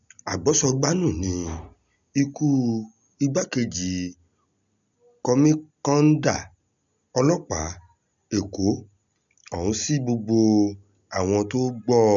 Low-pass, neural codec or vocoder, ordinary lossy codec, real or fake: 7.2 kHz; none; none; real